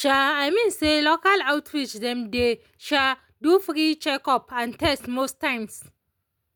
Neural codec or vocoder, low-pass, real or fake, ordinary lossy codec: none; none; real; none